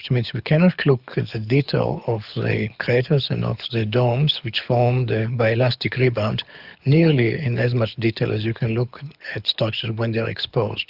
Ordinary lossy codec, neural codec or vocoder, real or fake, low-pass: Opus, 64 kbps; codec, 24 kHz, 6 kbps, HILCodec; fake; 5.4 kHz